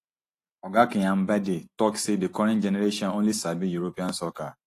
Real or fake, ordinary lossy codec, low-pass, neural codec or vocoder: real; AAC, 48 kbps; 14.4 kHz; none